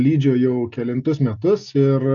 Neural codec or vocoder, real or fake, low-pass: none; real; 7.2 kHz